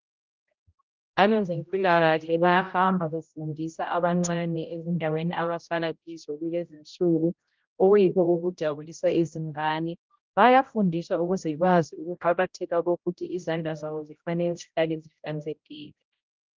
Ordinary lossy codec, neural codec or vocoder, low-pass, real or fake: Opus, 32 kbps; codec, 16 kHz, 0.5 kbps, X-Codec, HuBERT features, trained on general audio; 7.2 kHz; fake